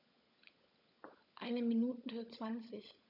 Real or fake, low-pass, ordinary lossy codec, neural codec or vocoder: fake; 5.4 kHz; none; codec, 16 kHz, 16 kbps, FunCodec, trained on LibriTTS, 50 frames a second